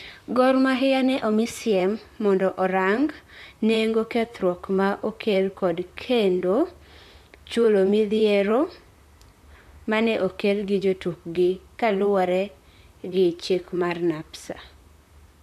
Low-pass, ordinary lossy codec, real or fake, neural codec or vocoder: 14.4 kHz; MP3, 96 kbps; fake; vocoder, 44.1 kHz, 128 mel bands, Pupu-Vocoder